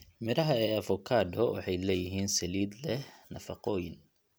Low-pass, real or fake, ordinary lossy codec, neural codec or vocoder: none; real; none; none